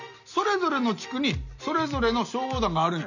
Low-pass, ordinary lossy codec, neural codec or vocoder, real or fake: 7.2 kHz; none; none; real